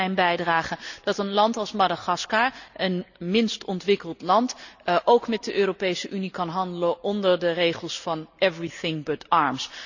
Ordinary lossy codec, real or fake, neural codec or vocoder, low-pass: none; real; none; 7.2 kHz